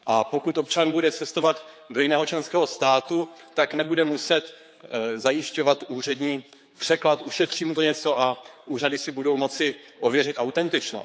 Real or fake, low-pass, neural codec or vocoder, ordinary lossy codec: fake; none; codec, 16 kHz, 4 kbps, X-Codec, HuBERT features, trained on general audio; none